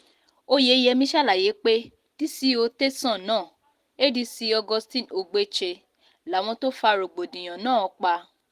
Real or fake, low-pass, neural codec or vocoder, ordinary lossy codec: real; 14.4 kHz; none; Opus, 24 kbps